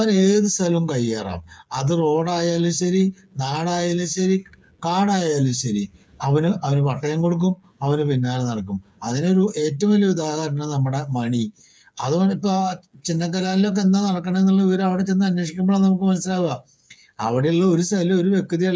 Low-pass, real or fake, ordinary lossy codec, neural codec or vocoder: none; fake; none; codec, 16 kHz, 8 kbps, FreqCodec, smaller model